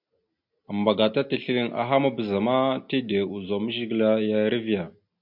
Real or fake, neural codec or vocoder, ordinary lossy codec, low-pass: real; none; MP3, 48 kbps; 5.4 kHz